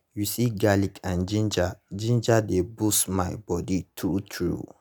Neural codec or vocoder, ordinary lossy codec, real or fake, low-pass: none; none; real; none